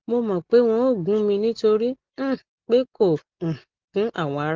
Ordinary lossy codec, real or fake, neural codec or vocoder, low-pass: Opus, 16 kbps; real; none; 7.2 kHz